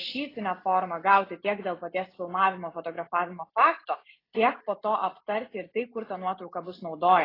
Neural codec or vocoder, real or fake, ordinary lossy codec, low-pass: none; real; AAC, 24 kbps; 5.4 kHz